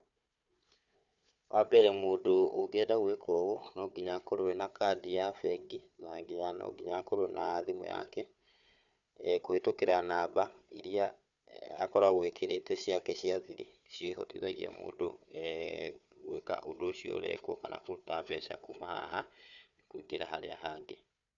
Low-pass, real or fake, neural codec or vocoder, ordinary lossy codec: 7.2 kHz; fake; codec, 16 kHz, 4 kbps, FunCodec, trained on Chinese and English, 50 frames a second; none